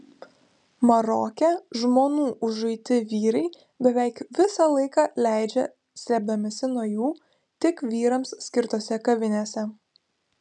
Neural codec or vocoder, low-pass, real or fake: none; 10.8 kHz; real